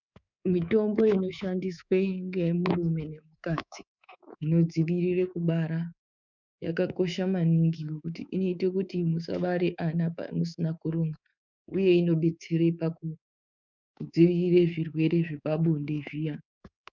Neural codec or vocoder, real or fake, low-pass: codec, 24 kHz, 3.1 kbps, DualCodec; fake; 7.2 kHz